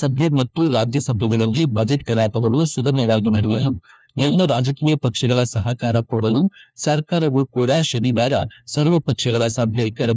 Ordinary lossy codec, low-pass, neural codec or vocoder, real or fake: none; none; codec, 16 kHz, 1 kbps, FunCodec, trained on LibriTTS, 50 frames a second; fake